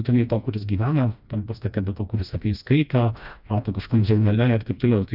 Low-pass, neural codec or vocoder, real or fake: 5.4 kHz; codec, 16 kHz, 1 kbps, FreqCodec, smaller model; fake